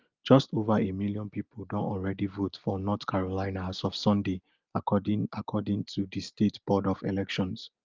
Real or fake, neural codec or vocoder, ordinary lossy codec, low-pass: real; none; Opus, 24 kbps; 7.2 kHz